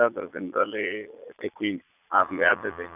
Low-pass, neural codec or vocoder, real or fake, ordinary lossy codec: 3.6 kHz; vocoder, 22.05 kHz, 80 mel bands, Vocos; fake; none